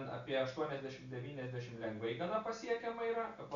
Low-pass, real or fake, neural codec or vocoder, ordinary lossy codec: 7.2 kHz; real; none; Opus, 64 kbps